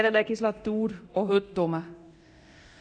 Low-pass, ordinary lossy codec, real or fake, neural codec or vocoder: 9.9 kHz; none; fake; codec, 24 kHz, 0.9 kbps, DualCodec